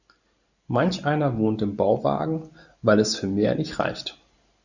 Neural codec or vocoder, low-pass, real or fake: none; 7.2 kHz; real